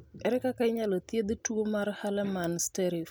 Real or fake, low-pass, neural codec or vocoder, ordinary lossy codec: real; none; none; none